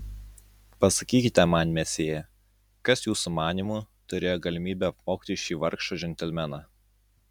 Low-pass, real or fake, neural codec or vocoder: 19.8 kHz; real; none